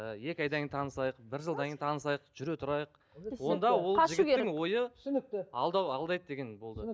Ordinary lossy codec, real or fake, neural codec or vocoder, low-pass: none; real; none; none